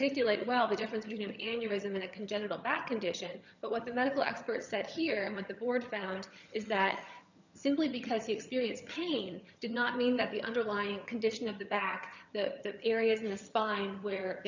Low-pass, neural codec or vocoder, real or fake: 7.2 kHz; vocoder, 22.05 kHz, 80 mel bands, HiFi-GAN; fake